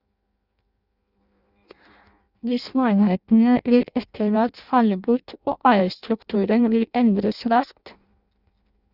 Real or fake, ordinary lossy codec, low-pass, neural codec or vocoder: fake; Opus, 64 kbps; 5.4 kHz; codec, 16 kHz in and 24 kHz out, 0.6 kbps, FireRedTTS-2 codec